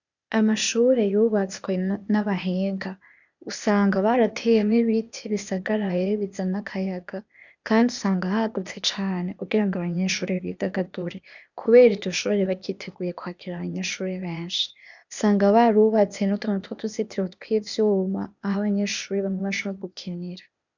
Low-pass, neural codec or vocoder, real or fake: 7.2 kHz; codec, 16 kHz, 0.8 kbps, ZipCodec; fake